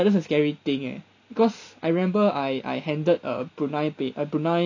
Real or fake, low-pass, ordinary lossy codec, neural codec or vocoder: real; 7.2 kHz; none; none